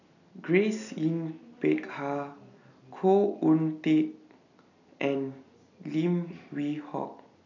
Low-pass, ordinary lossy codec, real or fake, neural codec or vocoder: 7.2 kHz; none; real; none